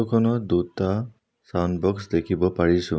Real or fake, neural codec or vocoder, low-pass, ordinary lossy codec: real; none; none; none